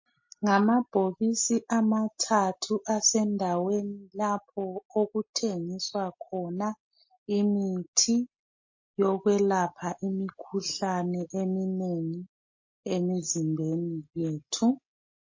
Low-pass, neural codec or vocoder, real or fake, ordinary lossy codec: 7.2 kHz; none; real; MP3, 32 kbps